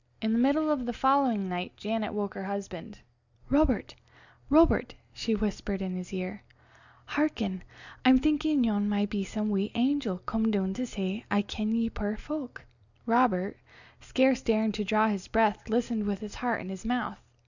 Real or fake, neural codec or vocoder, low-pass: real; none; 7.2 kHz